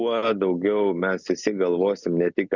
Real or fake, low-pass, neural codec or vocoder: real; 7.2 kHz; none